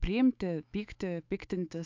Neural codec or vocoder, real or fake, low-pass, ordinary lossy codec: autoencoder, 48 kHz, 128 numbers a frame, DAC-VAE, trained on Japanese speech; fake; 7.2 kHz; AAC, 48 kbps